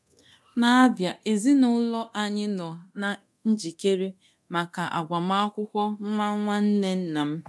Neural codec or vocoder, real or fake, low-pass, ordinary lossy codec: codec, 24 kHz, 0.9 kbps, DualCodec; fake; none; none